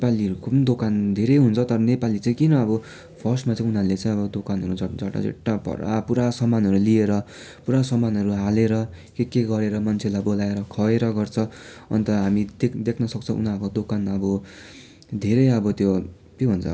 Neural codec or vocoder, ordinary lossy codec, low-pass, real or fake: none; none; none; real